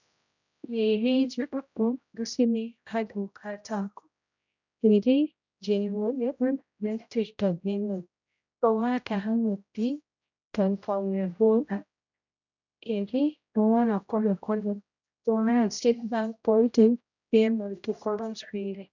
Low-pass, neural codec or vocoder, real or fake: 7.2 kHz; codec, 16 kHz, 0.5 kbps, X-Codec, HuBERT features, trained on general audio; fake